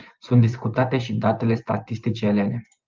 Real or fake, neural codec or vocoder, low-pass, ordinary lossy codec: fake; vocoder, 24 kHz, 100 mel bands, Vocos; 7.2 kHz; Opus, 24 kbps